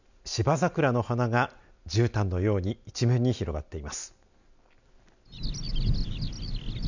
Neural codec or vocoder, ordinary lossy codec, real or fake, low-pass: none; none; real; 7.2 kHz